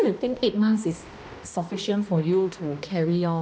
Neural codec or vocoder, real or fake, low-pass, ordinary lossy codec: codec, 16 kHz, 1 kbps, X-Codec, HuBERT features, trained on balanced general audio; fake; none; none